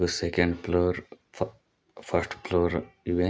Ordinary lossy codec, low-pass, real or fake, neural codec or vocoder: none; none; real; none